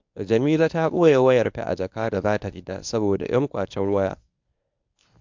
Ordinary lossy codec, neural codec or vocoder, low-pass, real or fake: MP3, 64 kbps; codec, 24 kHz, 0.9 kbps, WavTokenizer, medium speech release version 1; 7.2 kHz; fake